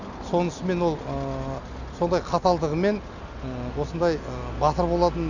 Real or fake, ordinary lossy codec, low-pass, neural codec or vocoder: real; none; 7.2 kHz; none